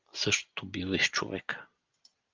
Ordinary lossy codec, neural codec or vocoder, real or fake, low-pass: Opus, 24 kbps; none; real; 7.2 kHz